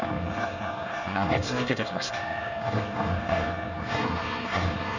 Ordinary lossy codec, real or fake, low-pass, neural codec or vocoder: none; fake; 7.2 kHz; codec, 24 kHz, 1 kbps, SNAC